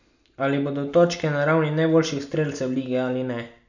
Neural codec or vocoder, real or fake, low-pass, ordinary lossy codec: none; real; 7.2 kHz; none